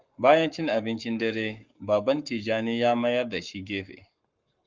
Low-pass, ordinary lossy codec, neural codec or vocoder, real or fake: 7.2 kHz; Opus, 24 kbps; codec, 44.1 kHz, 7.8 kbps, Pupu-Codec; fake